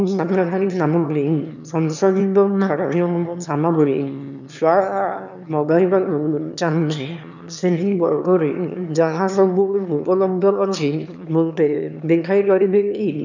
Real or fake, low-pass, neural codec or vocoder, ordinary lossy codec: fake; 7.2 kHz; autoencoder, 22.05 kHz, a latent of 192 numbers a frame, VITS, trained on one speaker; none